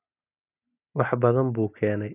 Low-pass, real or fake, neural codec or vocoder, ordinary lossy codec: 3.6 kHz; real; none; none